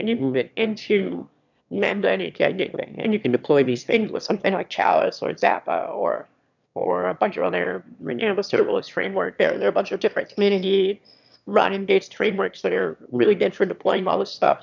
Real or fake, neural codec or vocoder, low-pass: fake; autoencoder, 22.05 kHz, a latent of 192 numbers a frame, VITS, trained on one speaker; 7.2 kHz